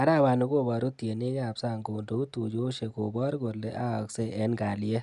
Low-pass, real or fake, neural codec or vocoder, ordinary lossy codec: 10.8 kHz; real; none; none